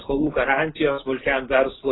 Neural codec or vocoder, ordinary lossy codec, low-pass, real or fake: none; AAC, 16 kbps; 7.2 kHz; real